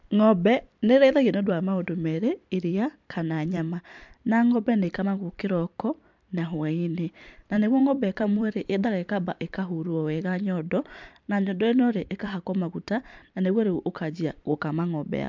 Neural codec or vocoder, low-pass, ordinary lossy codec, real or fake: vocoder, 44.1 kHz, 128 mel bands every 256 samples, BigVGAN v2; 7.2 kHz; MP3, 64 kbps; fake